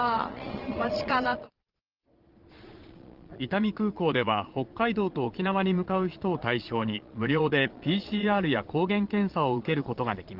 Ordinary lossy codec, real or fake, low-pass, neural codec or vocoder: Opus, 16 kbps; fake; 5.4 kHz; vocoder, 22.05 kHz, 80 mel bands, Vocos